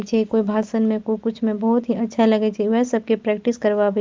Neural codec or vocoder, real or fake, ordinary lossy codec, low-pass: none; real; Opus, 32 kbps; 7.2 kHz